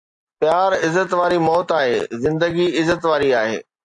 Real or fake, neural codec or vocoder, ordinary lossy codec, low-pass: real; none; AAC, 64 kbps; 10.8 kHz